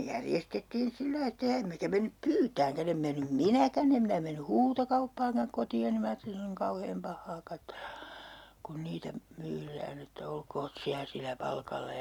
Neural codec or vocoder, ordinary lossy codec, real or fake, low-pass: none; none; real; 19.8 kHz